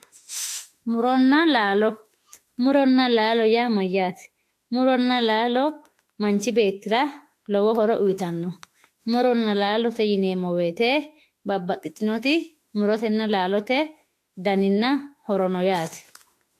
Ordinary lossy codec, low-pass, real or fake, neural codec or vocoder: AAC, 64 kbps; 14.4 kHz; fake; autoencoder, 48 kHz, 32 numbers a frame, DAC-VAE, trained on Japanese speech